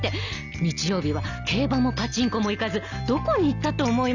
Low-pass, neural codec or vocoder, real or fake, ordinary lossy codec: 7.2 kHz; none; real; none